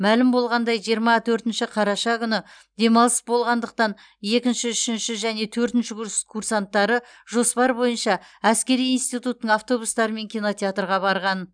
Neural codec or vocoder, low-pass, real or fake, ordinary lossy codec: none; 9.9 kHz; real; none